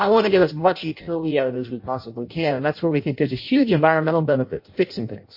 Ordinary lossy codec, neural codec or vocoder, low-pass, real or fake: MP3, 32 kbps; codec, 16 kHz in and 24 kHz out, 0.6 kbps, FireRedTTS-2 codec; 5.4 kHz; fake